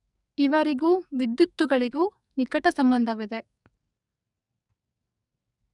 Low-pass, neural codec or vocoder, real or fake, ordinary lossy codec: 10.8 kHz; codec, 44.1 kHz, 2.6 kbps, SNAC; fake; none